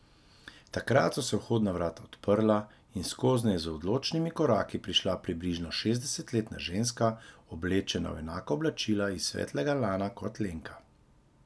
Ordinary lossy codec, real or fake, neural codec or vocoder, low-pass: none; real; none; none